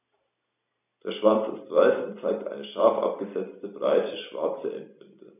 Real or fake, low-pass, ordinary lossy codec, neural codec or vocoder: real; 3.6 kHz; none; none